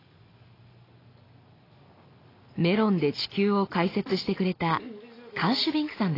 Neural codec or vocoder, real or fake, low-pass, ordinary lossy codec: none; real; 5.4 kHz; AAC, 24 kbps